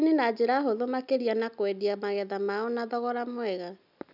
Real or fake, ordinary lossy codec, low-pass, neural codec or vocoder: real; none; 5.4 kHz; none